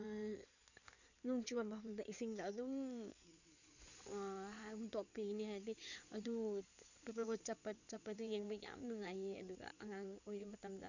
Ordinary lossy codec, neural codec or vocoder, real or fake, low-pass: none; codec, 16 kHz in and 24 kHz out, 2.2 kbps, FireRedTTS-2 codec; fake; 7.2 kHz